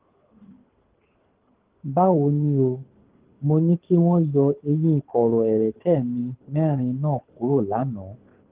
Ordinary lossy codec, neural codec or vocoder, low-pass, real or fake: Opus, 16 kbps; codec, 24 kHz, 6 kbps, HILCodec; 3.6 kHz; fake